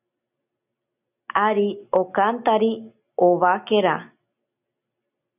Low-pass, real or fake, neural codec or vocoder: 3.6 kHz; real; none